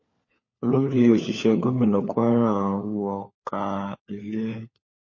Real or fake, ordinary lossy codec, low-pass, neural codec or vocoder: fake; MP3, 32 kbps; 7.2 kHz; codec, 16 kHz, 4 kbps, FunCodec, trained on LibriTTS, 50 frames a second